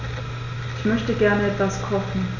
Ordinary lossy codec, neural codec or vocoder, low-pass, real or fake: none; none; 7.2 kHz; real